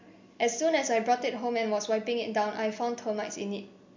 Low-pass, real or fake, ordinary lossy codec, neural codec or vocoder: 7.2 kHz; real; MP3, 48 kbps; none